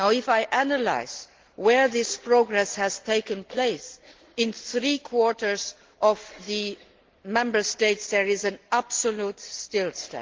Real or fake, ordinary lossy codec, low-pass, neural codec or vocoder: real; Opus, 16 kbps; 7.2 kHz; none